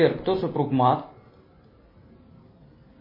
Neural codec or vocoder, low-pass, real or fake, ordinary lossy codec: none; 5.4 kHz; real; MP3, 24 kbps